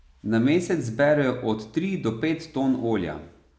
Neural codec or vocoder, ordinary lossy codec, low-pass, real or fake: none; none; none; real